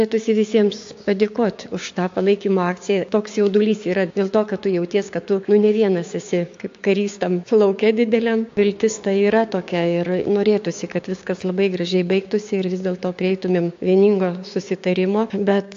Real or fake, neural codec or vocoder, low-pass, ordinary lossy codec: fake; codec, 16 kHz, 6 kbps, DAC; 7.2 kHz; AAC, 48 kbps